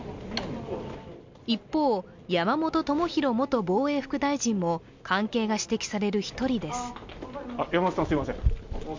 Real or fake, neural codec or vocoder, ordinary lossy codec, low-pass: real; none; MP3, 64 kbps; 7.2 kHz